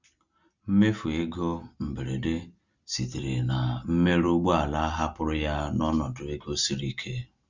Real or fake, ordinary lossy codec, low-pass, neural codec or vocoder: real; Opus, 64 kbps; 7.2 kHz; none